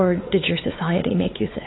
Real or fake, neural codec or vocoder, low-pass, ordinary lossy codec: fake; codec, 16 kHz, 4 kbps, X-Codec, WavLM features, trained on Multilingual LibriSpeech; 7.2 kHz; AAC, 16 kbps